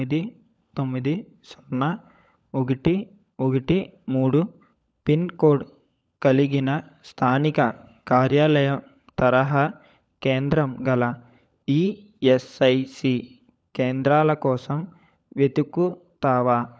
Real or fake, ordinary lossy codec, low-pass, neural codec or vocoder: fake; none; none; codec, 16 kHz, 16 kbps, FunCodec, trained on LibriTTS, 50 frames a second